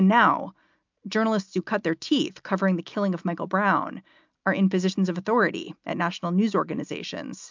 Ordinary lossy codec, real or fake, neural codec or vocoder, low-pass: MP3, 64 kbps; real; none; 7.2 kHz